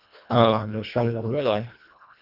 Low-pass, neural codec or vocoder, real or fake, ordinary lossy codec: 5.4 kHz; codec, 24 kHz, 1.5 kbps, HILCodec; fake; Opus, 64 kbps